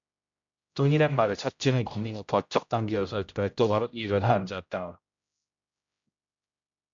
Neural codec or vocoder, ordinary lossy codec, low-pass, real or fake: codec, 16 kHz, 0.5 kbps, X-Codec, HuBERT features, trained on general audio; AAC, 64 kbps; 7.2 kHz; fake